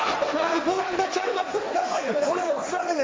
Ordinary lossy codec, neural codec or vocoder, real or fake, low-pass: none; codec, 16 kHz, 1.1 kbps, Voila-Tokenizer; fake; none